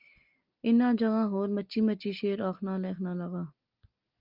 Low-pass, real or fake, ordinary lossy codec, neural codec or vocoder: 5.4 kHz; real; Opus, 24 kbps; none